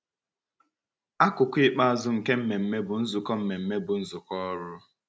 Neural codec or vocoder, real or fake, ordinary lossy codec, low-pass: none; real; none; none